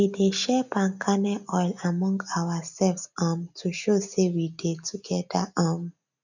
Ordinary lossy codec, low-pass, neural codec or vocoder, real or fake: none; 7.2 kHz; none; real